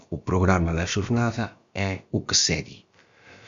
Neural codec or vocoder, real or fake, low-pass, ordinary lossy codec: codec, 16 kHz, about 1 kbps, DyCAST, with the encoder's durations; fake; 7.2 kHz; Opus, 64 kbps